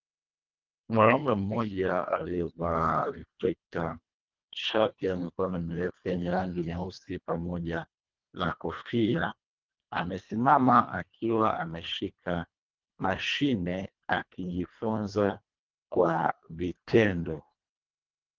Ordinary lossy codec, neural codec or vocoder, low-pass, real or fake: Opus, 32 kbps; codec, 24 kHz, 1.5 kbps, HILCodec; 7.2 kHz; fake